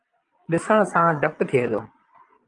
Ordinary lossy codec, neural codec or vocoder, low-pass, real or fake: Opus, 32 kbps; vocoder, 22.05 kHz, 80 mel bands, Vocos; 9.9 kHz; fake